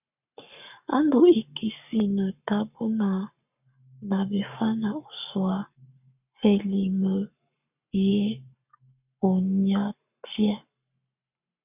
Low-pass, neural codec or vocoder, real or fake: 3.6 kHz; codec, 44.1 kHz, 7.8 kbps, Pupu-Codec; fake